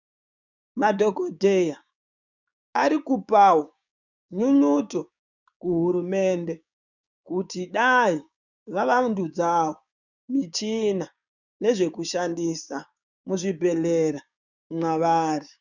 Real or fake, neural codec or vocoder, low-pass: fake; codec, 16 kHz, 6 kbps, DAC; 7.2 kHz